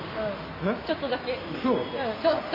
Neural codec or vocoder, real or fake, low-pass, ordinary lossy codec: none; real; 5.4 kHz; AAC, 32 kbps